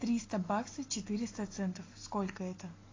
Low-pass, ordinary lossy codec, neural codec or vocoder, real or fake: 7.2 kHz; AAC, 32 kbps; none; real